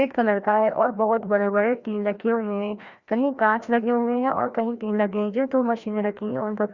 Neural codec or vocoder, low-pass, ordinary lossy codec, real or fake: codec, 16 kHz, 1 kbps, FreqCodec, larger model; 7.2 kHz; Opus, 64 kbps; fake